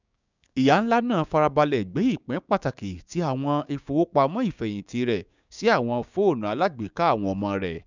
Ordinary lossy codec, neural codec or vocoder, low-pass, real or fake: none; codec, 16 kHz, 6 kbps, DAC; 7.2 kHz; fake